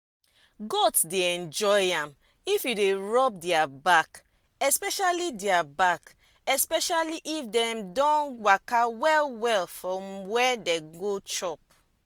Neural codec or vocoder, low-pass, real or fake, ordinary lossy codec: none; none; real; none